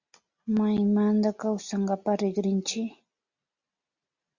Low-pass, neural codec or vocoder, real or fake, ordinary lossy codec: 7.2 kHz; none; real; Opus, 64 kbps